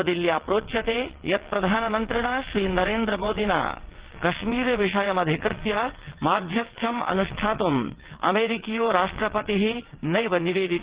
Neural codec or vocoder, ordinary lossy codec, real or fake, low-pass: vocoder, 22.05 kHz, 80 mel bands, WaveNeXt; Opus, 16 kbps; fake; 3.6 kHz